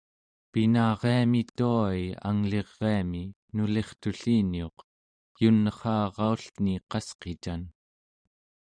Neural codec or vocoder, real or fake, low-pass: vocoder, 44.1 kHz, 128 mel bands every 512 samples, BigVGAN v2; fake; 9.9 kHz